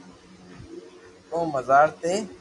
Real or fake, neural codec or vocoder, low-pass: real; none; 10.8 kHz